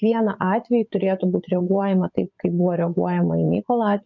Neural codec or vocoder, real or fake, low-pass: vocoder, 22.05 kHz, 80 mel bands, Vocos; fake; 7.2 kHz